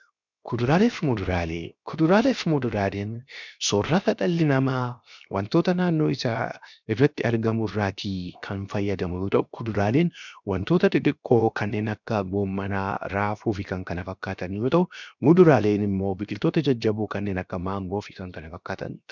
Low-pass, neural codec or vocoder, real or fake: 7.2 kHz; codec, 16 kHz, 0.7 kbps, FocalCodec; fake